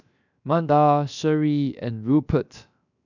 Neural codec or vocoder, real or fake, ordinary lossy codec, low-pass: codec, 16 kHz, 0.7 kbps, FocalCodec; fake; none; 7.2 kHz